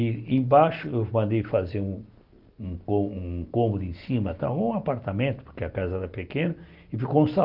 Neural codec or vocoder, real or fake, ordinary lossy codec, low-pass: none; real; Opus, 24 kbps; 5.4 kHz